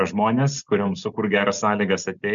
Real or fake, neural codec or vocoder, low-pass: real; none; 7.2 kHz